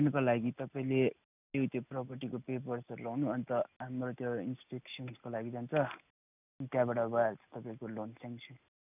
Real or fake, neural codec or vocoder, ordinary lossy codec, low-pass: real; none; none; 3.6 kHz